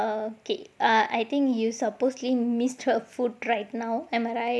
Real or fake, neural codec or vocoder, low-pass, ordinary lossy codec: real; none; none; none